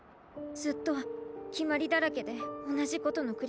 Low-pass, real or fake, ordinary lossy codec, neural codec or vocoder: none; real; none; none